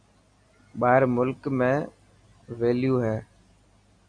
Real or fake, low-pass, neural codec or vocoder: real; 9.9 kHz; none